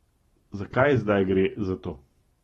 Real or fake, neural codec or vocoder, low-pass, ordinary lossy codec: fake; vocoder, 44.1 kHz, 128 mel bands every 512 samples, BigVGAN v2; 19.8 kHz; AAC, 32 kbps